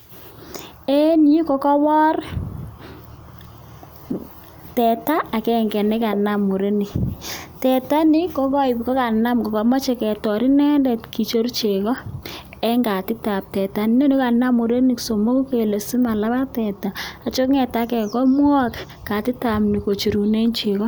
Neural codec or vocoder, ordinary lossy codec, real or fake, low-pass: none; none; real; none